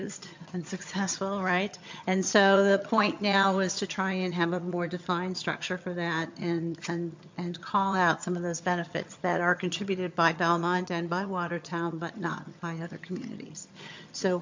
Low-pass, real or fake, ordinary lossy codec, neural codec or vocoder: 7.2 kHz; fake; MP3, 48 kbps; vocoder, 22.05 kHz, 80 mel bands, HiFi-GAN